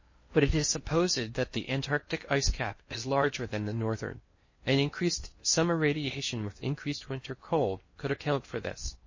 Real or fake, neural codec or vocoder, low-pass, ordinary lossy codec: fake; codec, 16 kHz in and 24 kHz out, 0.6 kbps, FocalCodec, streaming, 4096 codes; 7.2 kHz; MP3, 32 kbps